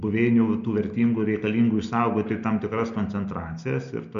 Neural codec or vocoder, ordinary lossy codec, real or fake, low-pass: none; MP3, 64 kbps; real; 7.2 kHz